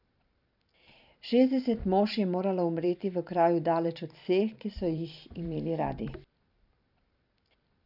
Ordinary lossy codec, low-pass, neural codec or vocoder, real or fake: none; 5.4 kHz; vocoder, 44.1 kHz, 128 mel bands every 512 samples, BigVGAN v2; fake